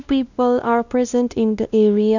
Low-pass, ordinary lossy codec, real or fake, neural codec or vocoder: 7.2 kHz; none; fake; codec, 16 kHz, 1 kbps, X-Codec, WavLM features, trained on Multilingual LibriSpeech